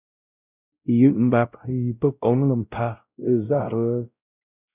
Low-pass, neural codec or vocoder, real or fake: 3.6 kHz; codec, 16 kHz, 0.5 kbps, X-Codec, WavLM features, trained on Multilingual LibriSpeech; fake